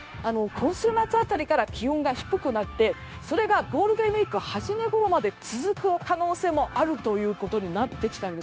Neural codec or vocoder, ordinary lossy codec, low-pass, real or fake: codec, 16 kHz, 0.9 kbps, LongCat-Audio-Codec; none; none; fake